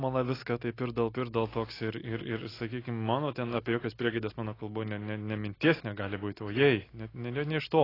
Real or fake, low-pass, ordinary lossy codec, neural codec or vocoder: real; 5.4 kHz; AAC, 24 kbps; none